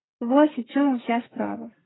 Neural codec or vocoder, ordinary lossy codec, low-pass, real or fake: codec, 32 kHz, 1.9 kbps, SNAC; AAC, 16 kbps; 7.2 kHz; fake